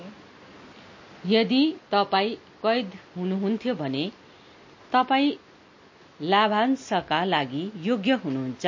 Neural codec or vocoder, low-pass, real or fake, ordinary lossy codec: none; 7.2 kHz; real; MP3, 32 kbps